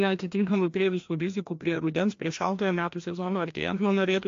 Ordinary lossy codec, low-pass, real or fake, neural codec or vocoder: AAC, 48 kbps; 7.2 kHz; fake; codec, 16 kHz, 1 kbps, FreqCodec, larger model